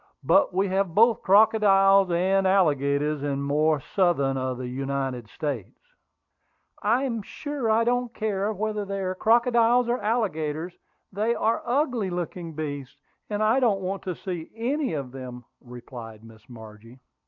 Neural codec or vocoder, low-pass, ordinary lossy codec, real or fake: none; 7.2 kHz; MP3, 64 kbps; real